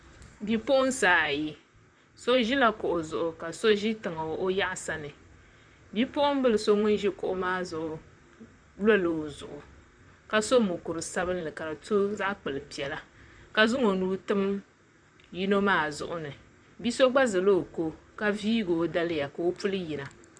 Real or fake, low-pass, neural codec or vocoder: fake; 9.9 kHz; vocoder, 44.1 kHz, 128 mel bands, Pupu-Vocoder